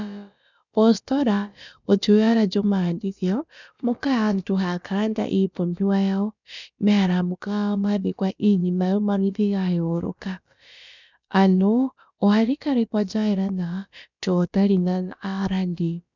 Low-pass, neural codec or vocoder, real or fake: 7.2 kHz; codec, 16 kHz, about 1 kbps, DyCAST, with the encoder's durations; fake